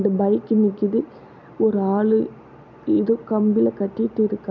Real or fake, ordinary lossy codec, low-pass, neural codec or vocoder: real; none; 7.2 kHz; none